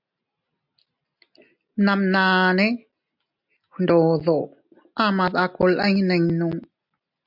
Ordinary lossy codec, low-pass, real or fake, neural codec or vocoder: MP3, 48 kbps; 5.4 kHz; real; none